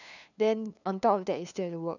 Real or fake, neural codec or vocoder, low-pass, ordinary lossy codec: fake; codec, 16 kHz, 2 kbps, FunCodec, trained on LibriTTS, 25 frames a second; 7.2 kHz; none